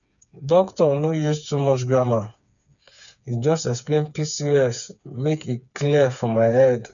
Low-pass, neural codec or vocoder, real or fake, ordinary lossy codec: 7.2 kHz; codec, 16 kHz, 4 kbps, FreqCodec, smaller model; fake; none